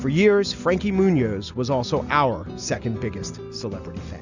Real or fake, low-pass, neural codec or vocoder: real; 7.2 kHz; none